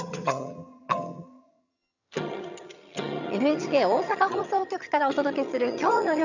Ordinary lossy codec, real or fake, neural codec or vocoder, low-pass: none; fake; vocoder, 22.05 kHz, 80 mel bands, HiFi-GAN; 7.2 kHz